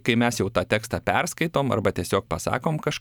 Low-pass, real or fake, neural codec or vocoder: 19.8 kHz; fake; vocoder, 44.1 kHz, 128 mel bands every 512 samples, BigVGAN v2